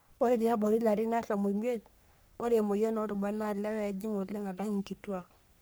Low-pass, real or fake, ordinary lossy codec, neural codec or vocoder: none; fake; none; codec, 44.1 kHz, 3.4 kbps, Pupu-Codec